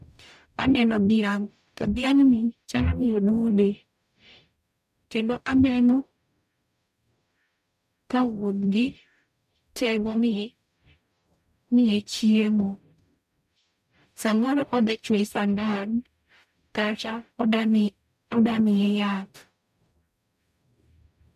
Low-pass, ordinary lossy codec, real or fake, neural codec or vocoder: 14.4 kHz; none; fake; codec, 44.1 kHz, 0.9 kbps, DAC